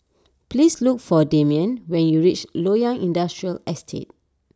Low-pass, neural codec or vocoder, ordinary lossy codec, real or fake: none; none; none; real